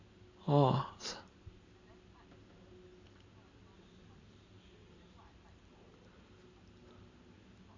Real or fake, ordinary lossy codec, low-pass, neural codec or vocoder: real; AAC, 32 kbps; 7.2 kHz; none